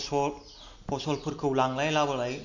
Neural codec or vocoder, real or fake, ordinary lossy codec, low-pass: none; real; none; 7.2 kHz